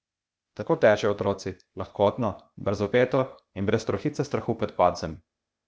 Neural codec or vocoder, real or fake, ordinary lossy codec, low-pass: codec, 16 kHz, 0.8 kbps, ZipCodec; fake; none; none